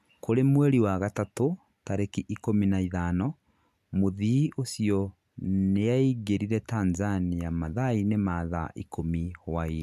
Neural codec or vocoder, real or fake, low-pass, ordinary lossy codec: none; real; 14.4 kHz; none